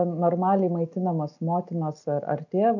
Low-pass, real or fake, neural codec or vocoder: 7.2 kHz; real; none